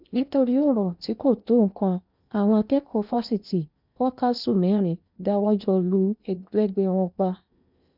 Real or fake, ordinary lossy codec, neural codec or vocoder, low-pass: fake; none; codec, 16 kHz in and 24 kHz out, 0.8 kbps, FocalCodec, streaming, 65536 codes; 5.4 kHz